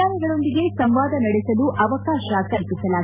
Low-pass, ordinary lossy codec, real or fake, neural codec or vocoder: 3.6 kHz; none; real; none